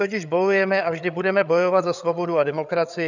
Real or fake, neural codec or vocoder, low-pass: fake; codec, 16 kHz, 8 kbps, FreqCodec, larger model; 7.2 kHz